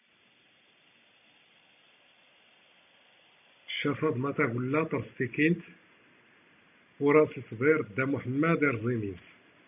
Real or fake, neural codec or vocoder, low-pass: real; none; 3.6 kHz